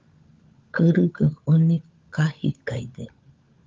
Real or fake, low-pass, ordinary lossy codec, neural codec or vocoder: fake; 7.2 kHz; Opus, 24 kbps; codec, 16 kHz, 16 kbps, FunCodec, trained on LibriTTS, 50 frames a second